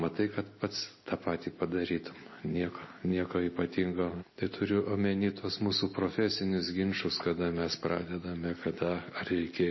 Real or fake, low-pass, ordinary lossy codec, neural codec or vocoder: real; 7.2 kHz; MP3, 24 kbps; none